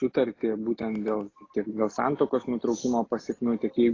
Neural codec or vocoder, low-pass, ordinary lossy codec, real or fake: none; 7.2 kHz; AAC, 32 kbps; real